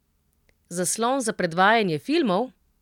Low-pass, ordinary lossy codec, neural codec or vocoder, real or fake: 19.8 kHz; none; none; real